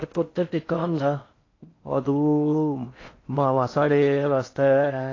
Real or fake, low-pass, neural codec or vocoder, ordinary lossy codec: fake; 7.2 kHz; codec, 16 kHz in and 24 kHz out, 0.6 kbps, FocalCodec, streaming, 4096 codes; AAC, 32 kbps